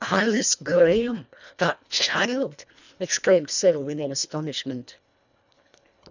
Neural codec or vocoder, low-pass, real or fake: codec, 24 kHz, 1.5 kbps, HILCodec; 7.2 kHz; fake